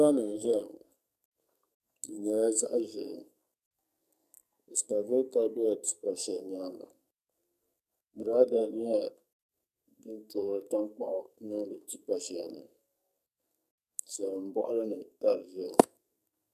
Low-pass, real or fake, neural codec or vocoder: 14.4 kHz; fake; codec, 44.1 kHz, 2.6 kbps, SNAC